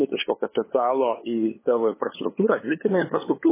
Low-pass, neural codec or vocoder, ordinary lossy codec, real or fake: 3.6 kHz; codec, 16 kHz, 8 kbps, FunCodec, trained on LibriTTS, 25 frames a second; MP3, 16 kbps; fake